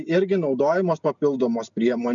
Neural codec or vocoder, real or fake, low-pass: none; real; 7.2 kHz